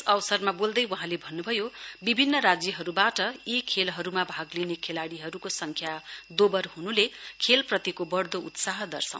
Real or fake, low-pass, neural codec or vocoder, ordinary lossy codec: real; none; none; none